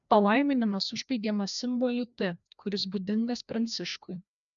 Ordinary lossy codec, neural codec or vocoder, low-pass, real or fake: MP3, 96 kbps; codec, 16 kHz, 1 kbps, FreqCodec, larger model; 7.2 kHz; fake